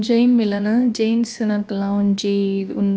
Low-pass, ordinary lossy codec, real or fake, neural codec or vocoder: none; none; fake; codec, 16 kHz, about 1 kbps, DyCAST, with the encoder's durations